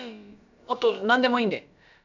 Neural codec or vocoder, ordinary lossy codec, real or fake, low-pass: codec, 16 kHz, about 1 kbps, DyCAST, with the encoder's durations; none; fake; 7.2 kHz